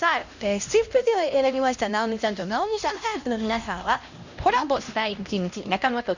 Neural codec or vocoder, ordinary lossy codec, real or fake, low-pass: codec, 16 kHz, 1 kbps, X-Codec, HuBERT features, trained on LibriSpeech; Opus, 64 kbps; fake; 7.2 kHz